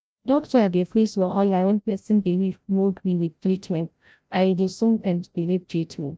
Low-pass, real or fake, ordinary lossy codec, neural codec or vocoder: none; fake; none; codec, 16 kHz, 0.5 kbps, FreqCodec, larger model